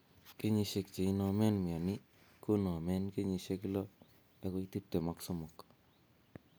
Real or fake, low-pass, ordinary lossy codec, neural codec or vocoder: real; none; none; none